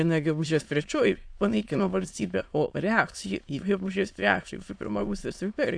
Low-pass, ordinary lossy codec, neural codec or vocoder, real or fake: 9.9 kHz; AAC, 64 kbps; autoencoder, 22.05 kHz, a latent of 192 numbers a frame, VITS, trained on many speakers; fake